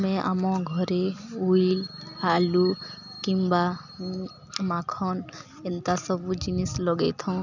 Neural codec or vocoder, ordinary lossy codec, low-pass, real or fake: none; none; 7.2 kHz; real